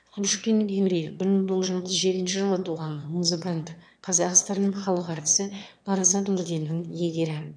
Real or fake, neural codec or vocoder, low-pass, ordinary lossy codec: fake; autoencoder, 22.05 kHz, a latent of 192 numbers a frame, VITS, trained on one speaker; 9.9 kHz; none